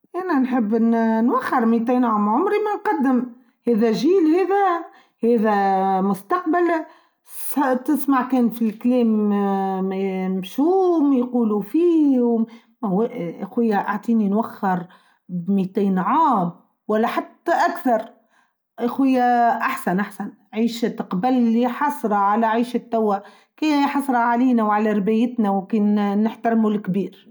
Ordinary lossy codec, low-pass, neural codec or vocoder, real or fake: none; none; none; real